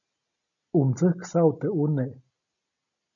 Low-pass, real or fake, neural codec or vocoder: 7.2 kHz; real; none